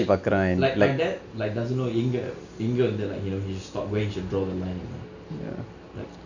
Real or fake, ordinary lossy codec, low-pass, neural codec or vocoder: real; none; 7.2 kHz; none